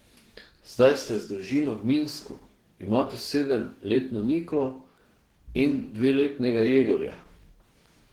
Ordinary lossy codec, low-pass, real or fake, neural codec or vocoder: Opus, 16 kbps; 19.8 kHz; fake; codec, 44.1 kHz, 2.6 kbps, DAC